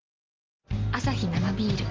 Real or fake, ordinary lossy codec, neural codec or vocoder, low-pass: real; Opus, 16 kbps; none; 7.2 kHz